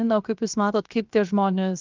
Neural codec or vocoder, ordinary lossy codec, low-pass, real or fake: codec, 16 kHz, 0.7 kbps, FocalCodec; Opus, 32 kbps; 7.2 kHz; fake